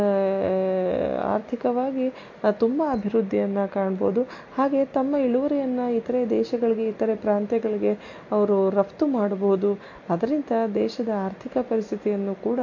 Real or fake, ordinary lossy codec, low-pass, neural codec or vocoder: real; MP3, 48 kbps; 7.2 kHz; none